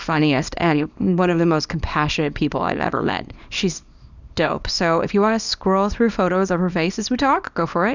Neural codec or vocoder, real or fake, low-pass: codec, 24 kHz, 0.9 kbps, WavTokenizer, small release; fake; 7.2 kHz